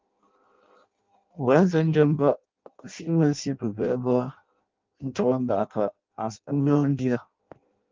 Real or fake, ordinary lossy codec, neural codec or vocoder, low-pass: fake; Opus, 32 kbps; codec, 16 kHz in and 24 kHz out, 0.6 kbps, FireRedTTS-2 codec; 7.2 kHz